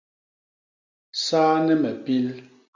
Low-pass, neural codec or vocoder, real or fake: 7.2 kHz; none; real